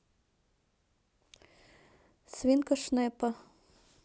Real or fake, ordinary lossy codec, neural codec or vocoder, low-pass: real; none; none; none